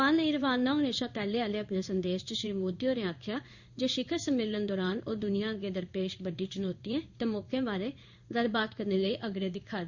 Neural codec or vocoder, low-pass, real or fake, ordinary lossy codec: codec, 16 kHz in and 24 kHz out, 1 kbps, XY-Tokenizer; 7.2 kHz; fake; Opus, 64 kbps